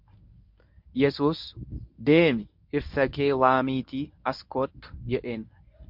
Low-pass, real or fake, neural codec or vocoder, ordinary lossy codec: 5.4 kHz; fake; codec, 24 kHz, 0.9 kbps, WavTokenizer, medium speech release version 1; MP3, 48 kbps